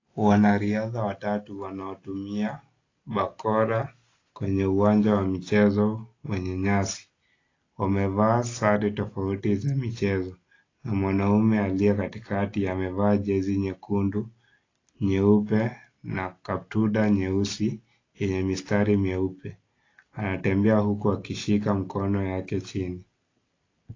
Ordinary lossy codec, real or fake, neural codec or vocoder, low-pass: AAC, 32 kbps; real; none; 7.2 kHz